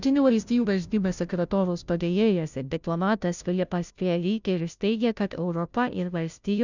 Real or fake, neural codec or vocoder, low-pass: fake; codec, 16 kHz, 0.5 kbps, FunCodec, trained on Chinese and English, 25 frames a second; 7.2 kHz